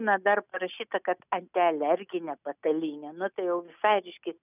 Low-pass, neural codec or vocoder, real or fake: 3.6 kHz; none; real